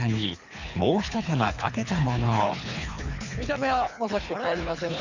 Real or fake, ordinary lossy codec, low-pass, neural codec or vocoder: fake; Opus, 64 kbps; 7.2 kHz; codec, 24 kHz, 3 kbps, HILCodec